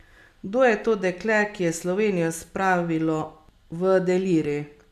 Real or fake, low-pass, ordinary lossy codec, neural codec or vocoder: real; 14.4 kHz; none; none